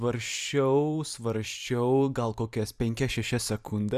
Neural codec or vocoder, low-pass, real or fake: vocoder, 44.1 kHz, 128 mel bands every 512 samples, BigVGAN v2; 14.4 kHz; fake